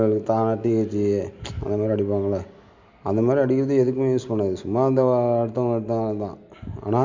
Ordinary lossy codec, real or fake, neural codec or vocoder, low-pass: MP3, 64 kbps; real; none; 7.2 kHz